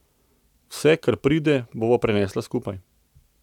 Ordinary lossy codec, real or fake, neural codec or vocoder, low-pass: none; real; none; 19.8 kHz